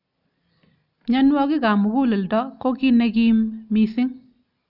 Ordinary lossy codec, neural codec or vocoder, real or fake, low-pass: none; none; real; 5.4 kHz